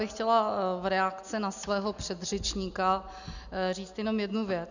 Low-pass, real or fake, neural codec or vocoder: 7.2 kHz; real; none